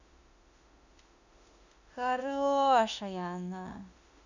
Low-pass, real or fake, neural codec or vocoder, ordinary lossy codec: 7.2 kHz; fake; autoencoder, 48 kHz, 32 numbers a frame, DAC-VAE, trained on Japanese speech; none